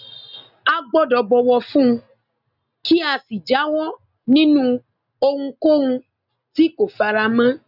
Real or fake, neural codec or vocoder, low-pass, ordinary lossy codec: real; none; 5.4 kHz; none